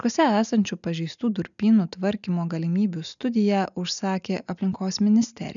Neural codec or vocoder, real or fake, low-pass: none; real; 7.2 kHz